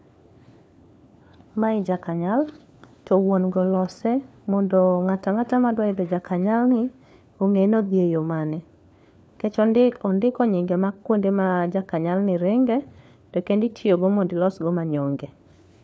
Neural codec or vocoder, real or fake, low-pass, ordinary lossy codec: codec, 16 kHz, 4 kbps, FunCodec, trained on LibriTTS, 50 frames a second; fake; none; none